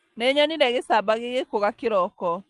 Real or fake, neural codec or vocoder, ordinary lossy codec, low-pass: real; none; Opus, 24 kbps; 10.8 kHz